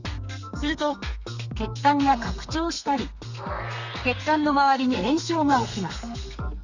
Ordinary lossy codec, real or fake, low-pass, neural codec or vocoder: none; fake; 7.2 kHz; codec, 32 kHz, 1.9 kbps, SNAC